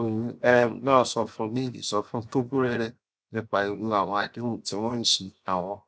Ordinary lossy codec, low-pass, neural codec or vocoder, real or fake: none; none; codec, 16 kHz, 0.7 kbps, FocalCodec; fake